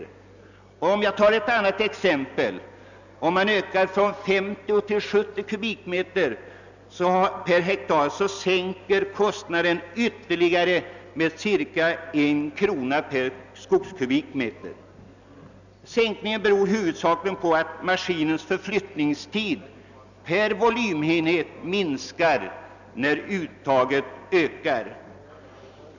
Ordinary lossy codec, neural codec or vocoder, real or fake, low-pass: none; none; real; 7.2 kHz